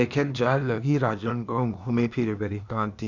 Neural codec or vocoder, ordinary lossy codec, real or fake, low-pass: codec, 16 kHz, 0.8 kbps, ZipCodec; none; fake; 7.2 kHz